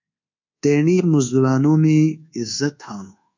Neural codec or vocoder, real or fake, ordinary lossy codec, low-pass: codec, 24 kHz, 1.2 kbps, DualCodec; fake; MP3, 64 kbps; 7.2 kHz